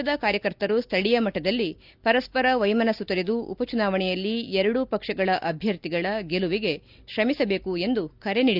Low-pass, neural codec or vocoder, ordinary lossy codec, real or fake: 5.4 kHz; none; Opus, 64 kbps; real